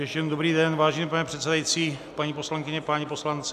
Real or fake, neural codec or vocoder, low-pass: real; none; 14.4 kHz